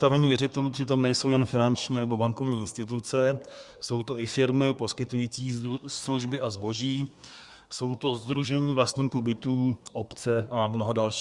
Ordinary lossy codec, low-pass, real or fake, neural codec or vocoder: Opus, 64 kbps; 10.8 kHz; fake; codec, 24 kHz, 1 kbps, SNAC